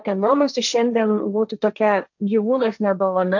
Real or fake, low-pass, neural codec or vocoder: fake; 7.2 kHz; codec, 16 kHz, 1.1 kbps, Voila-Tokenizer